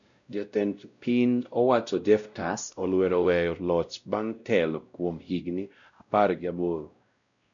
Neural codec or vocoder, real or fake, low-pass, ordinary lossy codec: codec, 16 kHz, 0.5 kbps, X-Codec, WavLM features, trained on Multilingual LibriSpeech; fake; 7.2 kHz; none